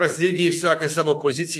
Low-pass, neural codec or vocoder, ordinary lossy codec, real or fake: 14.4 kHz; autoencoder, 48 kHz, 32 numbers a frame, DAC-VAE, trained on Japanese speech; AAC, 64 kbps; fake